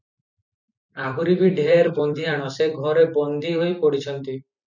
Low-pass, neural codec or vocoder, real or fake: 7.2 kHz; vocoder, 44.1 kHz, 128 mel bands every 256 samples, BigVGAN v2; fake